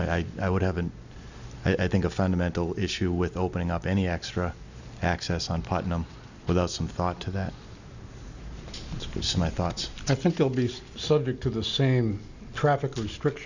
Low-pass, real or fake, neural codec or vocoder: 7.2 kHz; real; none